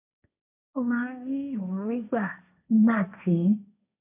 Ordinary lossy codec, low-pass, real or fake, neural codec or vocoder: AAC, 32 kbps; 3.6 kHz; fake; codec, 16 kHz, 1.1 kbps, Voila-Tokenizer